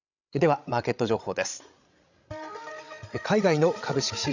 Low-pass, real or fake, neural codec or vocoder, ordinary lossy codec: 7.2 kHz; fake; codec, 16 kHz, 16 kbps, FreqCodec, larger model; Opus, 64 kbps